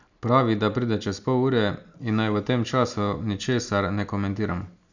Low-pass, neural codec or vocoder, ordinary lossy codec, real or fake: 7.2 kHz; none; none; real